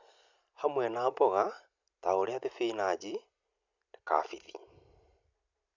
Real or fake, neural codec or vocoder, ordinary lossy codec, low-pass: real; none; none; 7.2 kHz